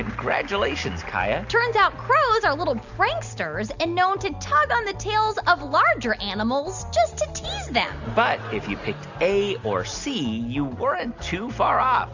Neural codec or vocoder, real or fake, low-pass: none; real; 7.2 kHz